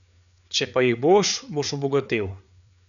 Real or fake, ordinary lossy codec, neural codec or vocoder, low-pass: fake; none; codec, 16 kHz, 4 kbps, FreqCodec, larger model; 7.2 kHz